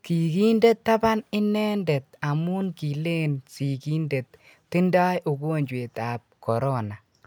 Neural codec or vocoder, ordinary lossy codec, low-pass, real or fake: none; none; none; real